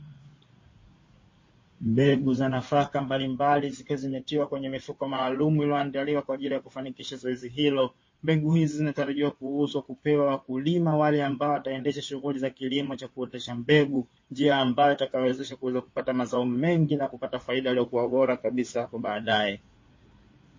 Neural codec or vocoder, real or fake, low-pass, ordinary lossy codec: codec, 16 kHz in and 24 kHz out, 2.2 kbps, FireRedTTS-2 codec; fake; 7.2 kHz; MP3, 32 kbps